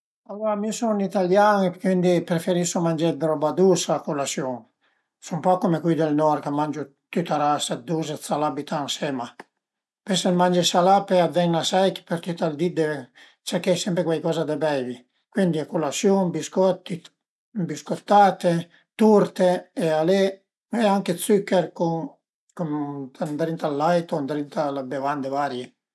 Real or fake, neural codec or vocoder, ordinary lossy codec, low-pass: real; none; none; none